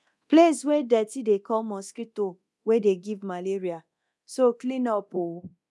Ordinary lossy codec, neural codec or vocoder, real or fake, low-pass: none; codec, 24 kHz, 0.9 kbps, DualCodec; fake; none